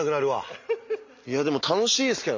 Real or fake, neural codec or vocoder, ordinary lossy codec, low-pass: real; none; MP3, 48 kbps; 7.2 kHz